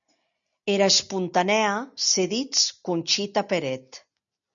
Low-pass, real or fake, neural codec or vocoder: 7.2 kHz; real; none